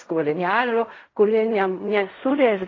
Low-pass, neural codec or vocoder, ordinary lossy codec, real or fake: 7.2 kHz; codec, 16 kHz in and 24 kHz out, 0.4 kbps, LongCat-Audio-Codec, fine tuned four codebook decoder; AAC, 32 kbps; fake